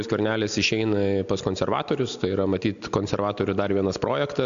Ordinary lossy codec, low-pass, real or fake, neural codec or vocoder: AAC, 96 kbps; 7.2 kHz; real; none